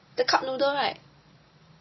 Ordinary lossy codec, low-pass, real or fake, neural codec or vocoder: MP3, 24 kbps; 7.2 kHz; real; none